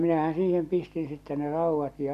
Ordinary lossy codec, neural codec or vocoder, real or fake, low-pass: none; none; real; 14.4 kHz